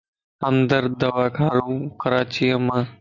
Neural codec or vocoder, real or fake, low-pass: none; real; 7.2 kHz